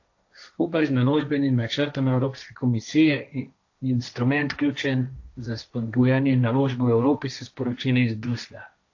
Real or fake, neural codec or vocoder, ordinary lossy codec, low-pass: fake; codec, 16 kHz, 1.1 kbps, Voila-Tokenizer; AAC, 48 kbps; 7.2 kHz